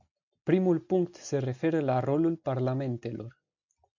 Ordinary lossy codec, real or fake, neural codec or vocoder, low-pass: MP3, 64 kbps; real; none; 7.2 kHz